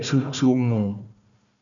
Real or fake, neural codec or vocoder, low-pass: fake; codec, 16 kHz, 1 kbps, FunCodec, trained on Chinese and English, 50 frames a second; 7.2 kHz